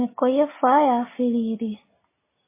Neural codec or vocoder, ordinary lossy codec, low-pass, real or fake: none; MP3, 16 kbps; 3.6 kHz; real